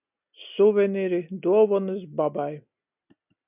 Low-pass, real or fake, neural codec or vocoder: 3.6 kHz; real; none